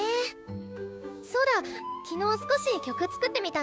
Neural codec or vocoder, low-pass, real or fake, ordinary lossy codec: codec, 16 kHz, 6 kbps, DAC; none; fake; none